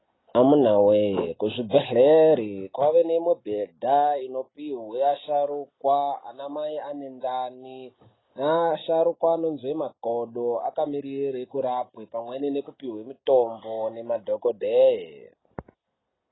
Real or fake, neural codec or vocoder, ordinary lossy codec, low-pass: real; none; AAC, 16 kbps; 7.2 kHz